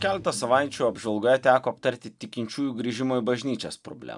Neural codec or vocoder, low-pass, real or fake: none; 10.8 kHz; real